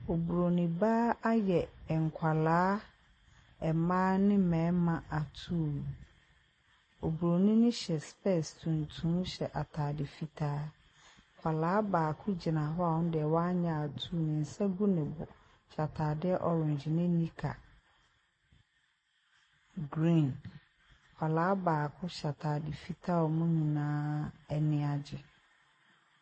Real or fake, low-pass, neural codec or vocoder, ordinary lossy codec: real; 9.9 kHz; none; MP3, 32 kbps